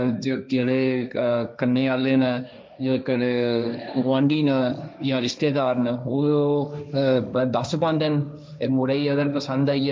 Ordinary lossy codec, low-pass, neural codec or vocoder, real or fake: none; none; codec, 16 kHz, 1.1 kbps, Voila-Tokenizer; fake